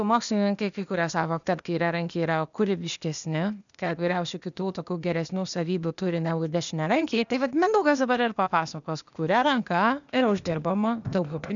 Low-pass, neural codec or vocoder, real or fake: 7.2 kHz; codec, 16 kHz, 0.8 kbps, ZipCodec; fake